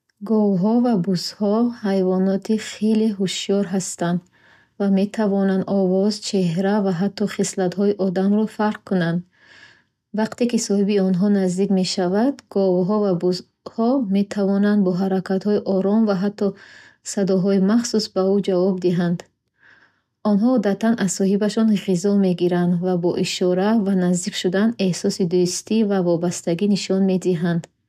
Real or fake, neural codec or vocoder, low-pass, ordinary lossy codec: real; none; 14.4 kHz; none